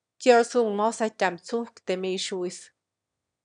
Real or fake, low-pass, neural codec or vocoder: fake; 9.9 kHz; autoencoder, 22.05 kHz, a latent of 192 numbers a frame, VITS, trained on one speaker